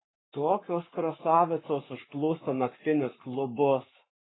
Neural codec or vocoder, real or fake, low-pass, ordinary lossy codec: codec, 44.1 kHz, 7.8 kbps, Pupu-Codec; fake; 7.2 kHz; AAC, 16 kbps